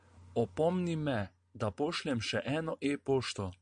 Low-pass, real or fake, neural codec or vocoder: 9.9 kHz; real; none